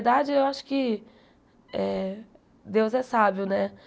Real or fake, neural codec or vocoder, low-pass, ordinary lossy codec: real; none; none; none